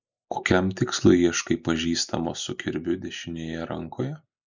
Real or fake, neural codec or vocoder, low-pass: real; none; 7.2 kHz